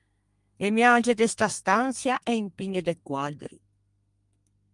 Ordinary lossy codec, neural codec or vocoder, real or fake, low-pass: Opus, 32 kbps; codec, 32 kHz, 1.9 kbps, SNAC; fake; 10.8 kHz